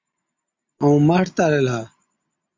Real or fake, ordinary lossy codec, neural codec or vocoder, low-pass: real; MP3, 64 kbps; none; 7.2 kHz